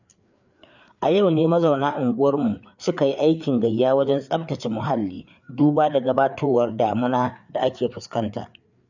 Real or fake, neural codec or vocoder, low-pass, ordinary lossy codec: fake; codec, 16 kHz, 4 kbps, FreqCodec, larger model; 7.2 kHz; none